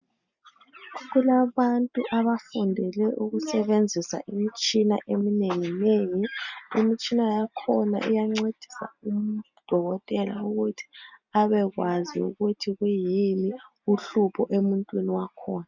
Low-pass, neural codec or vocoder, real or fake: 7.2 kHz; none; real